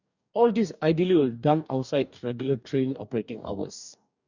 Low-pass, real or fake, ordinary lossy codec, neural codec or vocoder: 7.2 kHz; fake; none; codec, 44.1 kHz, 2.6 kbps, DAC